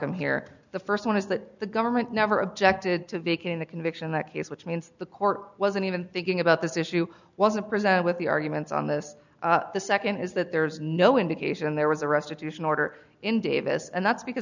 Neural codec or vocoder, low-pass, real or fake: none; 7.2 kHz; real